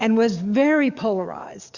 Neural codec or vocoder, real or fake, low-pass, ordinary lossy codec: codec, 44.1 kHz, 7.8 kbps, DAC; fake; 7.2 kHz; Opus, 64 kbps